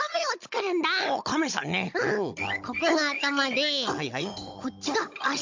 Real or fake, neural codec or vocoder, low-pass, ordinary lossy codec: fake; codec, 16 kHz, 16 kbps, FunCodec, trained on Chinese and English, 50 frames a second; 7.2 kHz; MP3, 48 kbps